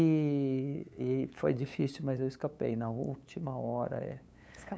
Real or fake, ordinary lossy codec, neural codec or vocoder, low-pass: fake; none; codec, 16 kHz, 8 kbps, FunCodec, trained on LibriTTS, 25 frames a second; none